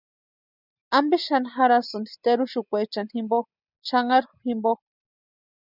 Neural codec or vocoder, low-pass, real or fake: none; 5.4 kHz; real